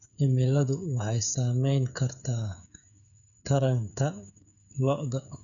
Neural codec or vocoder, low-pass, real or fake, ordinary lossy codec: codec, 16 kHz, 8 kbps, FreqCodec, smaller model; 7.2 kHz; fake; none